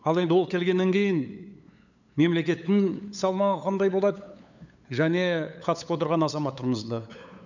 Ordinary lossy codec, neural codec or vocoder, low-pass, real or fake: none; codec, 16 kHz, 8 kbps, FunCodec, trained on LibriTTS, 25 frames a second; 7.2 kHz; fake